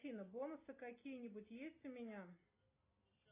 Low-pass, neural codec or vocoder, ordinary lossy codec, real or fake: 3.6 kHz; none; AAC, 24 kbps; real